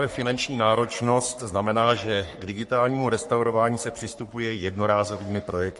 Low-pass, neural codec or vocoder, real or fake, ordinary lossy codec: 14.4 kHz; codec, 44.1 kHz, 3.4 kbps, Pupu-Codec; fake; MP3, 48 kbps